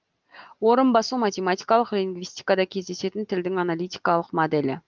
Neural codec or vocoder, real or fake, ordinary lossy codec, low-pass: none; real; Opus, 32 kbps; 7.2 kHz